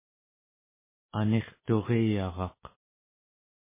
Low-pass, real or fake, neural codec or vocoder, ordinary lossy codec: 3.6 kHz; real; none; MP3, 16 kbps